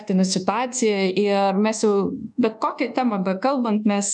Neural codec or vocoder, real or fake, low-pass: codec, 24 kHz, 1.2 kbps, DualCodec; fake; 10.8 kHz